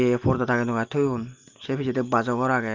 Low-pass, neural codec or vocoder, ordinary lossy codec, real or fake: 7.2 kHz; none; Opus, 32 kbps; real